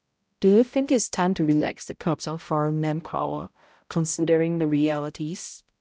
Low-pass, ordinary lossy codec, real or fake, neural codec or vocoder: none; none; fake; codec, 16 kHz, 0.5 kbps, X-Codec, HuBERT features, trained on balanced general audio